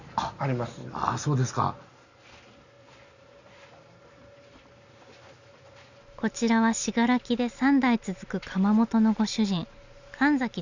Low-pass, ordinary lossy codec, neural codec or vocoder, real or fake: 7.2 kHz; none; none; real